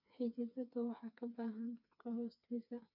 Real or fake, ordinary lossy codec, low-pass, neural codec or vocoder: fake; MP3, 48 kbps; 5.4 kHz; codec, 16 kHz, 4 kbps, FreqCodec, smaller model